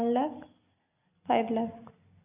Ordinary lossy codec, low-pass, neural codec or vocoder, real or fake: none; 3.6 kHz; none; real